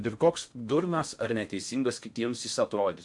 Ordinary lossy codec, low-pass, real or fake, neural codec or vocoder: MP3, 64 kbps; 10.8 kHz; fake; codec, 16 kHz in and 24 kHz out, 0.6 kbps, FocalCodec, streaming, 2048 codes